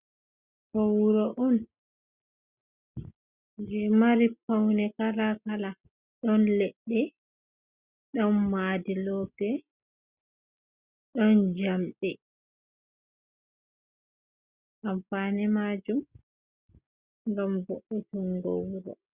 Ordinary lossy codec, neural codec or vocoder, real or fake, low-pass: Opus, 64 kbps; none; real; 3.6 kHz